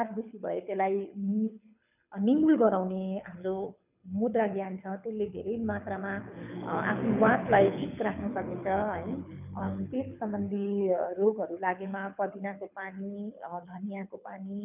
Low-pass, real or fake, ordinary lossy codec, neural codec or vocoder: 3.6 kHz; fake; none; codec, 24 kHz, 6 kbps, HILCodec